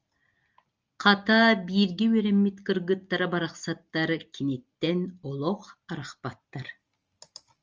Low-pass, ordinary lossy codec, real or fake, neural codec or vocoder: 7.2 kHz; Opus, 24 kbps; real; none